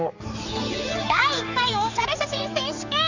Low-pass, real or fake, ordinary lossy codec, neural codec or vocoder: 7.2 kHz; fake; none; codec, 16 kHz, 4 kbps, X-Codec, HuBERT features, trained on general audio